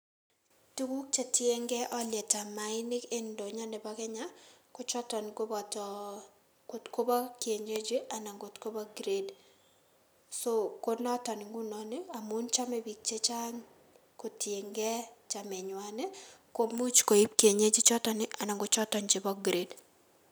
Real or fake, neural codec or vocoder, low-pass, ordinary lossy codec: real; none; none; none